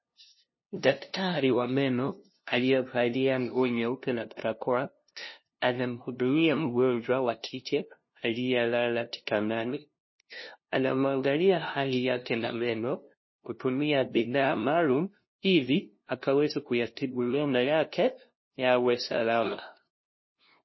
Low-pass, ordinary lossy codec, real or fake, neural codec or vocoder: 7.2 kHz; MP3, 24 kbps; fake; codec, 16 kHz, 0.5 kbps, FunCodec, trained on LibriTTS, 25 frames a second